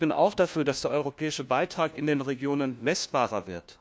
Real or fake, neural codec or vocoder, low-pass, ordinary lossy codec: fake; codec, 16 kHz, 1 kbps, FunCodec, trained on LibriTTS, 50 frames a second; none; none